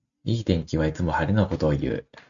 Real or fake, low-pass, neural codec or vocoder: real; 7.2 kHz; none